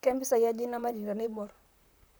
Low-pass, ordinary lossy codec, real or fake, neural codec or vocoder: none; none; fake; vocoder, 44.1 kHz, 128 mel bands, Pupu-Vocoder